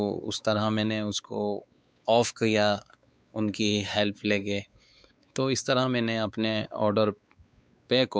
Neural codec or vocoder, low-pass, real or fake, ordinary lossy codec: codec, 16 kHz, 4 kbps, X-Codec, WavLM features, trained on Multilingual LibriSpeech; none; fake; none